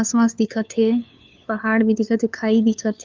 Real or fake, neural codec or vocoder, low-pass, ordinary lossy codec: fake; codec, 16 kHz, 2 kbps, FunCodec, trained on LibriTTS, 25 frames a second; 7.2 kHz; Opus, 24 kbps